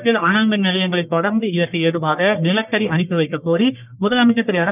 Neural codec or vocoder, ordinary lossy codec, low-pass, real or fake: codec, 44.1 kHz, 1.7 kbps, Pupu-Codec; none; 3.6 kHz; fake